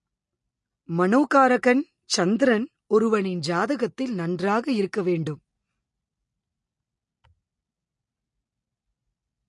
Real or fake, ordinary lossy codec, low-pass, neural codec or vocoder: real; MP3, 48 kbps; 10.8 kHz; none